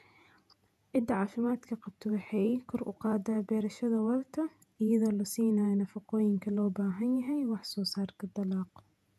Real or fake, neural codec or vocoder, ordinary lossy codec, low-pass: fake; vocoder, 48 kHz, 128 mel bands, Vocos; none; 14.4 kHz